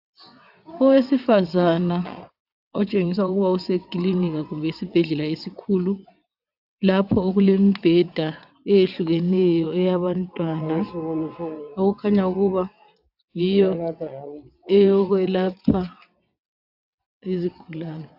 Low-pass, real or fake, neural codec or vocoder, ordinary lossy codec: 5.4 kHz; fake; vocoder, 22.05 kHz, 80 mel bands, WaveNeXt; AAC, 48 kbps